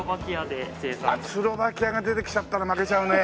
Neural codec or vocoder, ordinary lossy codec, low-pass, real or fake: none; none; none; real